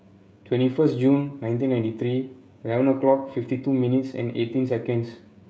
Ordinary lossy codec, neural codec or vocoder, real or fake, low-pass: none; codec, 16 kHz, 16 kbps, FreqCodec, smaller model; fake; none